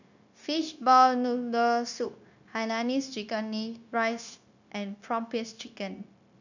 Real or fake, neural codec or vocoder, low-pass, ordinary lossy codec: fake; codec, 16 kHz, 0.9 kbps, LongCat-Audio-Codec; 7.2 kHz; none